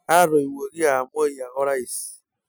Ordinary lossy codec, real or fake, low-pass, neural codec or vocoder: none; real; none; none